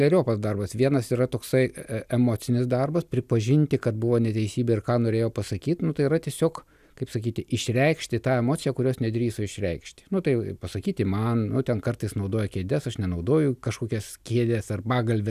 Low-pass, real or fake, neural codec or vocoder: 14.4 kHz; real; none